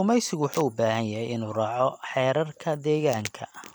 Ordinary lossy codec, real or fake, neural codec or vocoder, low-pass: none; fake; vocoder, 44.1 kHz, 128 mel bands every 256 samples, BigVGAN v2; none